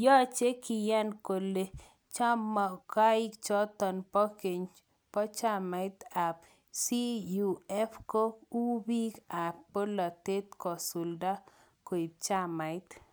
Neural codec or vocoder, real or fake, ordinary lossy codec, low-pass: none; real; none; none